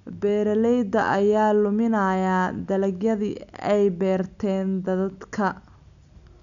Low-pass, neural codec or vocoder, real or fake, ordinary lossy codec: 7.2 kHz; none; real; none